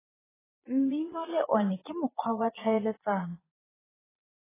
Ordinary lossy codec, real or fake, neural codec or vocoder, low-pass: AAC, 16 kbps; fake; vocoder, 22.05 kHz, 80 mel bands, Vocos; 3.6 kHz